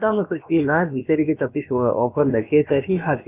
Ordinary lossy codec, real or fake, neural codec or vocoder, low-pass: none; fake; codec, 16 kHz, about 1 kbps, DyCAST, with the encoder's durations; 3.6 kHz